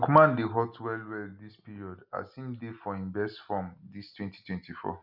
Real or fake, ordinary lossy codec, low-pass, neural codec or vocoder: real; none; 5.4 kHz; none